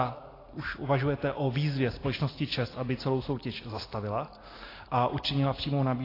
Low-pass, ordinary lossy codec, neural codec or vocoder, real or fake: 5.4 kHz; AAC, 24 kbps; none; real